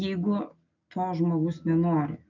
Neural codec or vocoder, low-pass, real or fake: none; 7.2 kHz; real